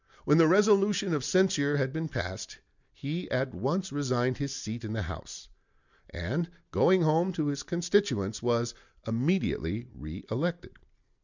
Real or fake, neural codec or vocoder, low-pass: real; none; 7.2 kHz